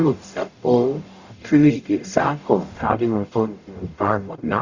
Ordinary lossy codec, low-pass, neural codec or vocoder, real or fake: Opus, 64 kbps; 7.2 kHz; codec, 44.1 kHz, 0.9 kbps, DAC; fake